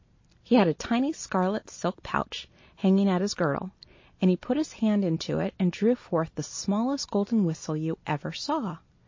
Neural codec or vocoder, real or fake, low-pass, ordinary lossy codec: none; real; 7.2 kHz; MP3, 32 kbps